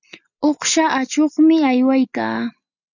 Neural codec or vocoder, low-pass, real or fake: none; 7.2 kHz; real